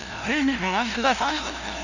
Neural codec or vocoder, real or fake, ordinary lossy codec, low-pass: codec, 16 kHz, 0.5 kbps, FunCodec, trained on LibriTTS, 25 frames a second; fake; none; 7.2 kHz